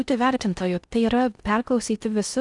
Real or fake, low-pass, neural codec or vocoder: fake; 10.8 kHz; codec, 16 kHz in and 24 kHz out, 0.6 kbps, FocalCodec, streaming, 4096 codes